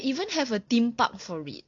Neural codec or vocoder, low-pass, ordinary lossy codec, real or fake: none; 7.2 kHz; MP3, 64 kbps; real